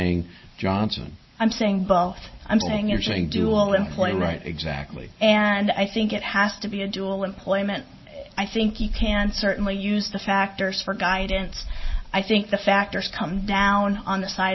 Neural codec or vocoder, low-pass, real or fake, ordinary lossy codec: none; 7.2 kHz; real; MP3, 24 kbps